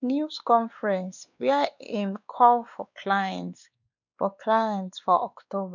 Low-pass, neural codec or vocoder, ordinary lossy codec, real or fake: 7.2 kHz; codec, 16 kHz, 2 kbps, X-Codec, WavLM features, trained on Multilingual LibriSpeech; none; fake